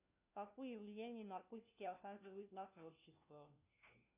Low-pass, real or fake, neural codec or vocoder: 3.6 kHz; fake; codec, 16 kHz, 1 kbps, FunCodec, trained on LibriTTS, 50 frames a second